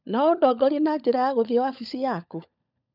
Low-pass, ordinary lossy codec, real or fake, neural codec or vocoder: 5.4 kHz; none; fake; codec, 16 kHz, 16 kbps, FunCodec, trained on LibriTTS, 50 frames a second